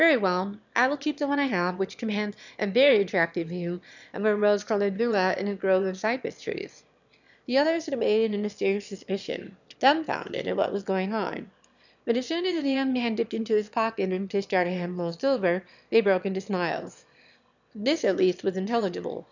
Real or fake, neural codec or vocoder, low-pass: fake; autoencoder, 22.05 kHz, a latent of 192 numbers a frame, VITS, trained on one speaker; 7.2 kHz